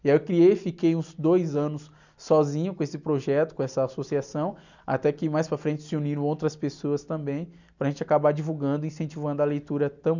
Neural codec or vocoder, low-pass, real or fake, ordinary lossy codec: none; 7.2 kHz; real; none